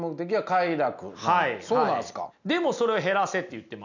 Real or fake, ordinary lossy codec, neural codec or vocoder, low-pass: real; none; none; 7.2 kHz